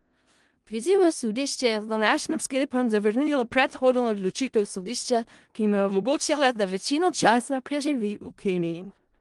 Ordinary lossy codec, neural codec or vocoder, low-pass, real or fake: Opus, 24 kbps; codec, 16 kHz in and 24 kHz out, 0.4 kbps, LongCat-Audio-Codec, four codebook decoder; 10.8 kHz; fake